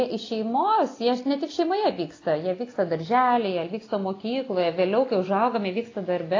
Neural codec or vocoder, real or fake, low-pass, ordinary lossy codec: none; real; 7.2 kHz; AAC, 32 kbps